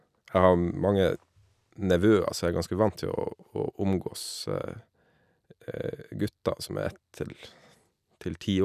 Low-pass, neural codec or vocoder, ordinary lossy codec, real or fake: 14.4 kHz; none; none; real